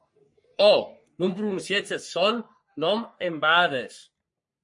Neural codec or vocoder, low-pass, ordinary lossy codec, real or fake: codec, 44.1 kHz, 3.4 kbps, Pupu-Codec; 10.8 kHz; MP3, 48 kbps; fake